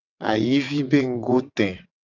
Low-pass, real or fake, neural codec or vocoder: 7.2 kHz; fake; vocoder, 22.05 kHz, 80 mel bands, WaveNeXt